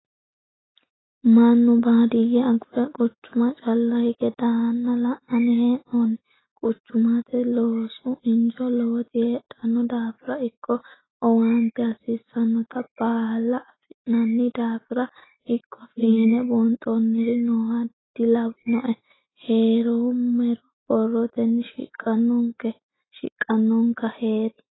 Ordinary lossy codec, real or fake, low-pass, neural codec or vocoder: AAC, 16 kbps; real; 7.2 kHz; none